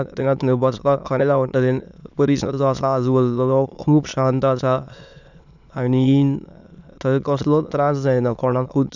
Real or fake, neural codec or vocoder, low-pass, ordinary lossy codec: fake; autoencoder, 22.05 kHz, a latent of 192 numbers a frame, VITS, trained on many speakers; 7.2 kHz; none